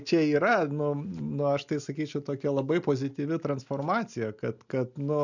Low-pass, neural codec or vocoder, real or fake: 7.2 kHz; none; real